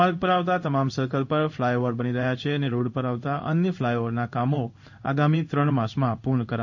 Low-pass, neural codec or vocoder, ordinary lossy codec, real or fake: 7.2 kHz; codec, 16 kHz in and 24 kHz out, 1 kbps, XY-Tokenizer; none; fake